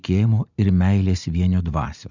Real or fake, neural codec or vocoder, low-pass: real; none; 7.2 kHz